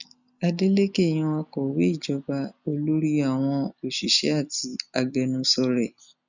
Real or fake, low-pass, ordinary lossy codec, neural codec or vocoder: real; 7.2 kHz; none; none